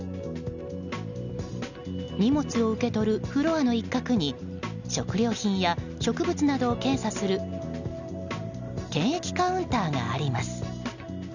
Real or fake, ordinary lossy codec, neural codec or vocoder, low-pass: real; none; none; 7.2 kHz